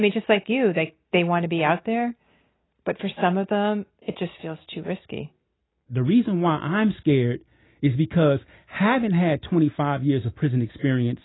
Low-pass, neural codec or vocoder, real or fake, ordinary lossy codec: 7.2 kHz; none; real; AAC, 16 kbps